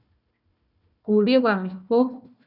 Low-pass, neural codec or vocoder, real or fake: 5.4 kHz; codec, 16 kHz, 1 kbps, FunCodec, trained on Chinese and English, 50 frames a second; fake